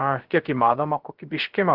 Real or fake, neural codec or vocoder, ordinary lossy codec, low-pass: fake; codec, 16 kHz, 0.3 kbps, FocalCodec; Opus, 16 kbps; 5.4 kHz